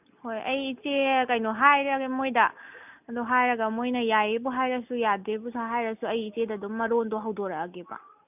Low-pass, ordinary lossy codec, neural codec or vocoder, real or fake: 3.6 kHz; none; none; real